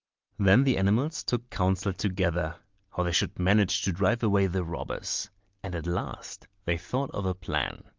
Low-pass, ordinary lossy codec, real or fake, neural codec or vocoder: 7.2 kHz; Opus, 32 kbps; real; none